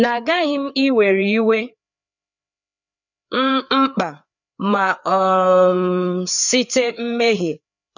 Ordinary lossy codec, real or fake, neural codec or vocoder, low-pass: none; fake; codec, 16 kHz, 4 kbps, FreqCodec, larger model; 7.2 kHz